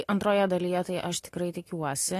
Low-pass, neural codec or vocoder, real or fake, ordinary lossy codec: 14.4 kHz; none; real; AAC, 48 kbps